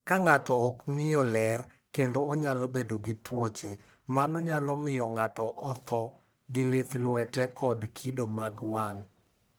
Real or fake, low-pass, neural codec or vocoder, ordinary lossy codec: fake; none; codec, 44.1 kHz, 1.7 kbps, Pupu-Codec; none